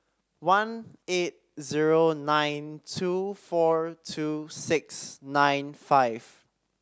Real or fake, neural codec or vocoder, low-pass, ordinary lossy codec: real; none; none; none